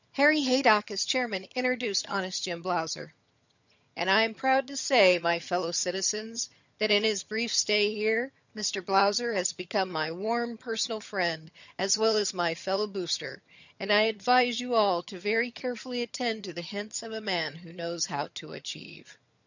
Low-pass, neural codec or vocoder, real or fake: 7.2 kHz; vocoder, 22.05 kHz, 80 mel bands, HiFi-GAN; fake